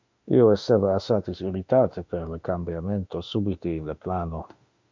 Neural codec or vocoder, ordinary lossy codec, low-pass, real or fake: autoencoder, 48 kHz, 32 numbers a frame, DAC-VAE, trained on Japanese speech; MP3, 64 kbps; 7.2 kHz; fake